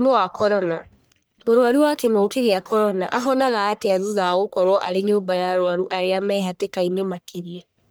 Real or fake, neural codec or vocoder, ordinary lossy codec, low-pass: fake; codec, 44.1 kHz, 1.7 kbps, Pupu-Codec; none; none